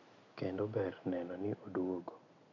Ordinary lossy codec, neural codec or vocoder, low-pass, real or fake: none; none; 7.2 kHz; real